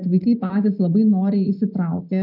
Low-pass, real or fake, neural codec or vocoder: 5.4 kHz; real; none